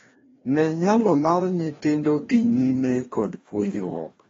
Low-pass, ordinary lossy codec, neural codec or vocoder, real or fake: 7.2 kHz; AAC, 24 kbps; codec, 16 kHz, 1 kbps, FreqCodec, larger model; fake